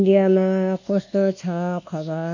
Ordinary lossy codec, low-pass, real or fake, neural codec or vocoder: none; 7.2 kHz; fake; codec, 24 kHz, 1.2 kbps, DualCodec